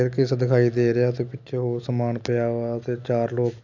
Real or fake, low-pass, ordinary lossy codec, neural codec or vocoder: real; 7.2 kHz; none; none